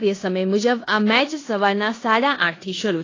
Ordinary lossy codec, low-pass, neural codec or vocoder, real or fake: AAC, 32 kbps; 7.2 kHz; codec, 16 kHz in and 24 kHz out, 0.9 kbps, LongCat-Audio-Codec, four codebook decoder; fake